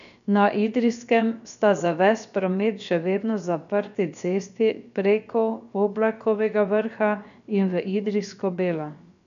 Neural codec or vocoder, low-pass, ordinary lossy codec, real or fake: codec, 16 kHz, about 1 kbps, DyCAST, with the encoder's durations; 7.2 kHz; none; fake